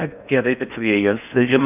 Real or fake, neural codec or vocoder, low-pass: fake; codec, 16 kHz in and 24 kHz out, 0.6 kbps, FocalCodec, streaming, 4096 codes; 3.6 kHz